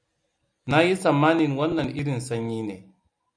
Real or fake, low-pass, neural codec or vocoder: real; 9.9 kHz; none